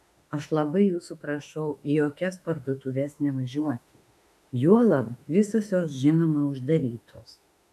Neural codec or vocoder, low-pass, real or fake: autoencoder, 48 kHz, 32 numbers a frame, DAC-VAE, trained on Japanese speech; 14.4 kHz; fake